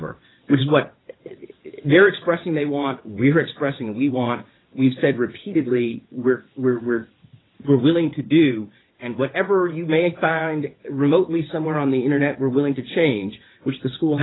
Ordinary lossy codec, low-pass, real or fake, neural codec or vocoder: AAC, 16 kbps; 7.2 kHz; fake; vocoder, 22.05 kHz, 80 mel bands, WaveNeXt